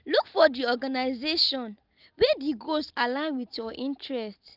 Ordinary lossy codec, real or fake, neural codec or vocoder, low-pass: Opus, 32 kbps; real; none; 5.4 kHz